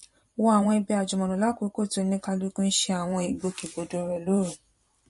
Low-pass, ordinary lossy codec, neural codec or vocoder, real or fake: 14.4 kHz; MP3, 48 kbps; vocoder, 44.1 kHz, 128 mel bands every 512 samples, BigVGAN v2; fake